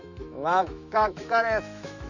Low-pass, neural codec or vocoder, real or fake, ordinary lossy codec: 7.2 kHz; autoencoder, 48 kHz, 128 numbers a frame, DAC-VAE, trained on Japanese speech; fake; none